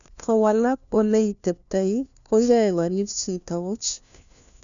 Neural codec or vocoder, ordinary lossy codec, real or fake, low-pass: codec, 16 kHz, 1 kbps, FunCodec, trained on LibriTTS, 50 frames a second; none; fake; 7.2 kHz